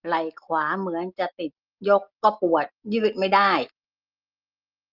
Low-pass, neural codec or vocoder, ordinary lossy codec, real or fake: 5.4 kHz; none; Opus, 32 kbps; real